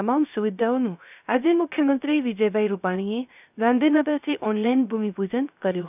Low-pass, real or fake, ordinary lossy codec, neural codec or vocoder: 3.6 kHz; fake; none; codec, 16 kHz, 0.3 kbps, FocalCodec